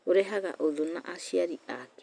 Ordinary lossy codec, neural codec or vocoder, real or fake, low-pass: none; none; real; 9.9 kHz